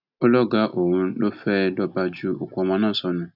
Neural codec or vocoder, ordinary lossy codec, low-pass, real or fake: none; none; 5.4 kHz; real